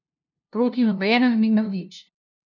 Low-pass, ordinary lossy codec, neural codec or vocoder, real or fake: 7.2 kHz; none; codec, 16 kHz, 0.5 kbps, FunCodec, trained on LibriTTS, 25 frames a second; fake